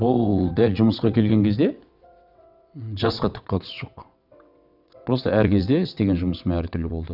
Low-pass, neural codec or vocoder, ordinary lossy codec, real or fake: 5.4 kHz; vocoder, 22.05 kHz, 80 mel bands, WaveNeXt; none; fake